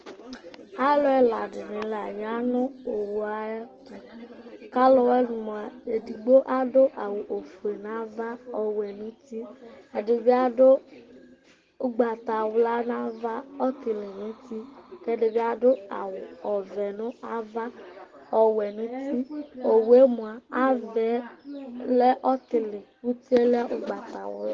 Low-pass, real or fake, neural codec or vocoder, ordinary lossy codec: 7.2 kHz; real; none; Opus, 16 kbps